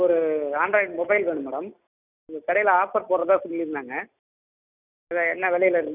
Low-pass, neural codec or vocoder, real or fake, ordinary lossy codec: 3.6 kHz; none; real; none